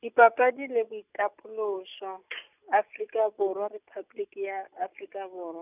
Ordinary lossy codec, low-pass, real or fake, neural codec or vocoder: none; 3.6 kHz; real; none